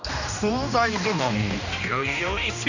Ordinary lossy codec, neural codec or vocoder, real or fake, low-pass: none; codec, 16 kHz, 1 kbps, X-Codec, HuBERT features, trained on general audio; fake; 7.2 kHz